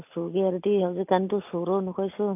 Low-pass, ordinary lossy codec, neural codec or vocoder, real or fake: 3.6 kHz; AAC, 32 kbps; none; real